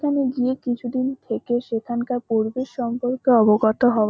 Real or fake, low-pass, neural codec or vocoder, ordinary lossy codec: real; none; none; none